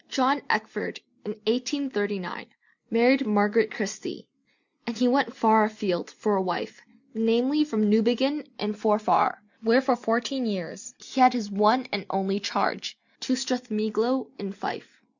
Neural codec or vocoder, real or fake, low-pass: none; real; 7.2 kHz